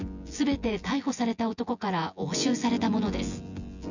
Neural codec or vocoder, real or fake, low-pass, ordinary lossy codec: vocoder, 24 kHz, 100 mel bands, Vocos; fake; 7.2 kHz; none